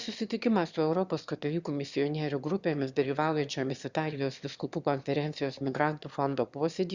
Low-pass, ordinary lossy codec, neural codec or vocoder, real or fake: 7.2 kHz; Opus, 64 kbps; autoencoder, 22.05 kHz, a latent of 192 numbers a frame, VITS, trained on one speaker; fake